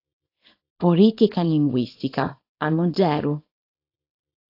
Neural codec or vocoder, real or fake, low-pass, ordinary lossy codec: codec, 24 kHz, 0.9 kbps, WavTokenizer, small release; fake; 5.4 kHz; AAC, 32 kbps